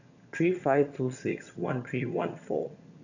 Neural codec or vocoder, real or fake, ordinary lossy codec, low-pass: vocoder, 22.05 kHz, 80 mel bands, HiFi-GAN; fake; none; 7.2 kHz